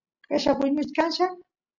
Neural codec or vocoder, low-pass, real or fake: none; 7.2 kHz; real